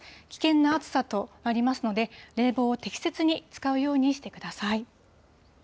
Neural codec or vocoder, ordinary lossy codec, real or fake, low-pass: none; none; real; none